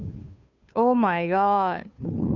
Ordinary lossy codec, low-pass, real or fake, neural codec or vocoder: none; 7.2 kHz; fake; codec, 16 kHz, 2 kbps, FunCodec, trained on Chinese and English, 25 frames a second